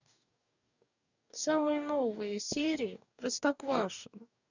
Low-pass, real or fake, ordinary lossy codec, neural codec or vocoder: 7.2 kHz; fake; none; codec, 44.1 kHz, 2.6 kbps, DAC